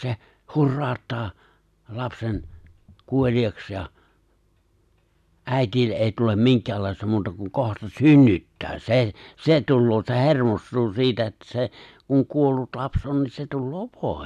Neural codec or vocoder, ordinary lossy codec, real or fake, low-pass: none; none; real; 14.4 kHz